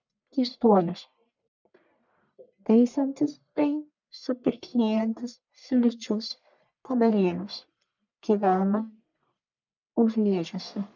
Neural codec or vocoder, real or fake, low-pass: codec, 44.1 kHz, 1.7 kbps, Pupu-Codec; fake; 7.2 kHz